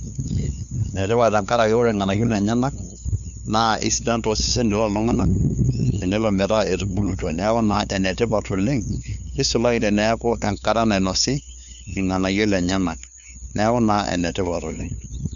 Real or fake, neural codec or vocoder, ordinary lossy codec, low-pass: fake; codec, 16 kHz, 2 kbps, FunCodec, trained on LibriTTS, 25 frames a second; none; 7.2 kHz